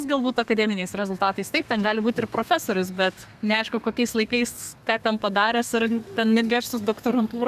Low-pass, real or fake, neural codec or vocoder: 14.4 kHz; fake; codec, 32 kHz, 1.9 kbps, SNAC